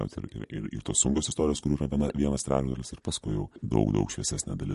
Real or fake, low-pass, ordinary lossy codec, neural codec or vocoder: real; 14.4 kHz; MP3, 48 kbps; none